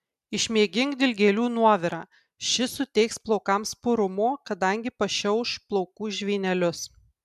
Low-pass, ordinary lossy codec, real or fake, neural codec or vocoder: 14.4 kHz; MP3, 96 kbps; real; none